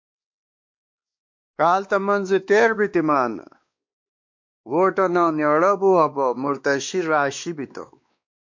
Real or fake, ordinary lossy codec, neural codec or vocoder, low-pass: fake; MP3, 48 kbps; codec, 16 kHz, 2 kbps, X-Codec, WavLM features, trained on Multilingual LibriSpeech; 7.2 kHz